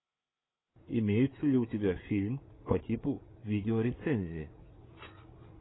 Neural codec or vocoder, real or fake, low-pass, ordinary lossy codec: codec, 16 kHz, 8 kbps, FreqCodec, larger model; fake; 7.2 kHz; AAC, 16 kbps